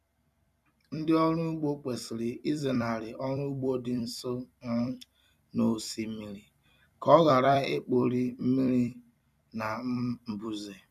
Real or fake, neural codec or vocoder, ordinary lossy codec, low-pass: fake; vocoder, 44.1 kHz, 128 mel bands every 256 samples, BigVGAN v2; none; 14.4 kHz